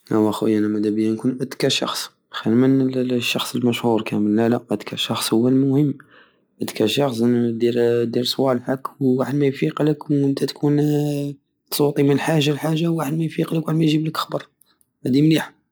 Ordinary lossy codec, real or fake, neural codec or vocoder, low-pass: none; real; none; none